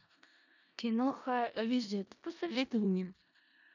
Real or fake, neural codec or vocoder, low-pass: fake; codec, 16 kHz in and 24 kHz out, 0.4 kbps, LongCat-Audio-Codec, four codebook decoder; 7.2 kHz